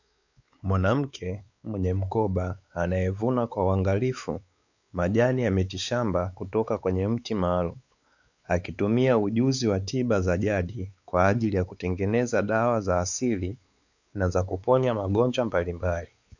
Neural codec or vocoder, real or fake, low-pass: codec, 16 kHz, 4 kbps, X-Codec, WavLM features, trained on Multilingual LibriSpeech; fake; 7.2 kHz